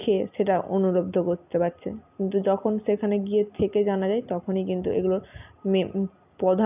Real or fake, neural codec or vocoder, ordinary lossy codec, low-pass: real; none; none; 3.6 kHz